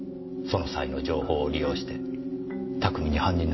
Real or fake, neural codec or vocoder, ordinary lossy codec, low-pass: real; none; MP3, 24 kbps; 7.2 kHz